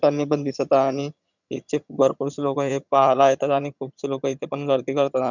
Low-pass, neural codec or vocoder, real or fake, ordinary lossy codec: 7.2 kHz; vocoder, 22.05 kHz, 80 mel bands, HiFi-GAN; fake; none